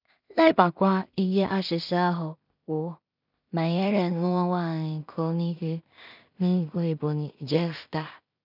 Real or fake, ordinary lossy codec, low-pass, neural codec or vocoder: fake; none; 5.4 kHz; codec, 16 kHz in and 24 kHz out, 0.4 kbps, LongCat-Audio-Codec, two codebook decoder